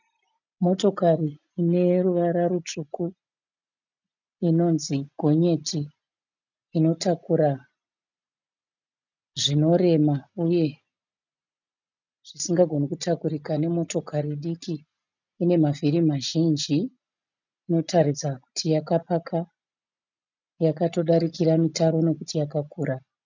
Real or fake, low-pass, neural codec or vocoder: real; 7.2 kHz; none